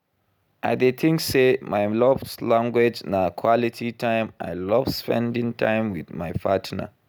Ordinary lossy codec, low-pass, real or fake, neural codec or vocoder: none; none; real; none